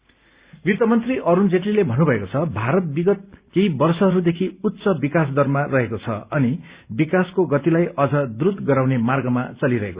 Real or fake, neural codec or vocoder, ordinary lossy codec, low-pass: real; none; Opus, 64 kbps; 3.6 kHz